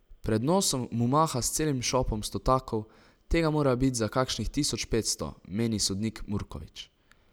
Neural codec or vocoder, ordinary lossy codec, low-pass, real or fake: none; none; none; real